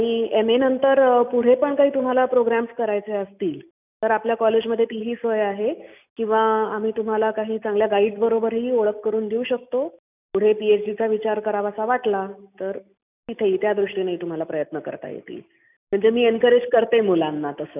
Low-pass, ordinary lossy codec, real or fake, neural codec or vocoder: 3.6 kHz; none; real; none